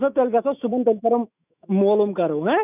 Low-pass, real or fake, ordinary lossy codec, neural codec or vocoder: 3.6 kHz; fake; none; autoencoder, 48 kHz, 128 numbers a frame, DAC-VAE, trained on Japanese speech